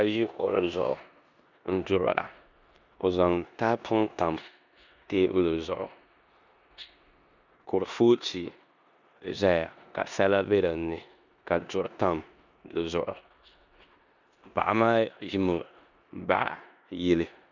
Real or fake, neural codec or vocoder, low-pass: fake; codec, 16 kHz in and 24 kHz out, 0.9 kbps, LongCat-Audio-Codec, four codebook decoder; 7.2 kHz